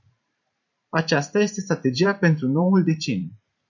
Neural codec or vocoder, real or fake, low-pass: vocoder, 44.1 kHz, 128 mel bands every 512 samples, BigVGAN v2; fake; 7.2 kHz